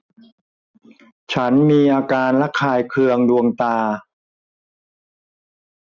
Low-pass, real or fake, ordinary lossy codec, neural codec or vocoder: 7.2 kHz; real; none; none